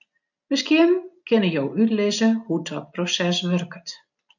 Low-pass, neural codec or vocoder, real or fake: 7.2 kHz; none; real